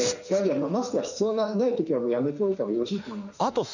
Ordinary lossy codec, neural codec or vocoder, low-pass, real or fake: none; autoencoder, 48 kHz, 32 numbers a frame, DAC-VAE, trained on Japanese speech; 7.2 kHz; fake